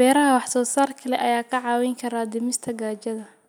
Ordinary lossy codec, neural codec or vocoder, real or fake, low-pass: none; none; real; none